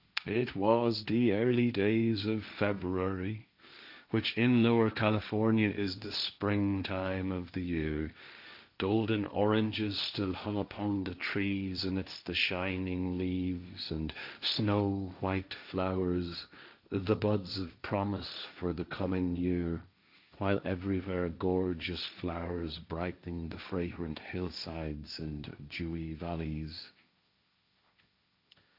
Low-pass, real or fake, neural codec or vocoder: 5.4 kHz; fake; codec, 16 kHz, 1.1 kbps, Voila-Tokenizer